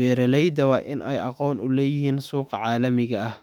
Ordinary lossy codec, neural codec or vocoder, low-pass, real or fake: none; autoencoder, 48 kHz, 32 numbers a frame, DAC-VAE, trained on Japanese speech; 19.8 kHz; fake